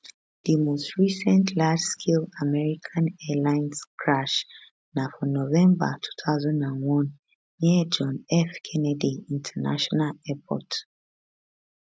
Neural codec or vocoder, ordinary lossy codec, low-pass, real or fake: none; none; none; real